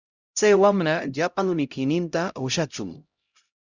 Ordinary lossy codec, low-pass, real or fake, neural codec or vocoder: Opus, 64 kbps; 7.2 kHz; fake; codec, 16 kHz, 0.5 kbps, X-Codec, HuBERT features, trained on LibriSpeech